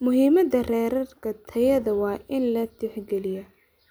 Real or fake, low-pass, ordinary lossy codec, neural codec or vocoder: real; none; none; none